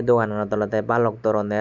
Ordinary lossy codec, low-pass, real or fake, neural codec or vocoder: none; 7.2 kHz; real; none